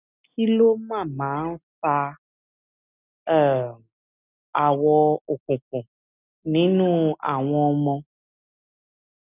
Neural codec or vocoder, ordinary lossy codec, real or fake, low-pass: none; none; real; 3.6 kHz